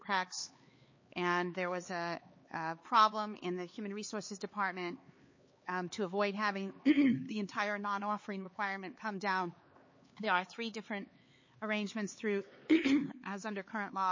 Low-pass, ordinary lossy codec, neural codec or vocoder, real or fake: 7.2 kHz; MP3, 32 kbps; codec, 16 kHz, 4 kbps, X-Codec, HuBERT features, trained on LibriSpeech; fake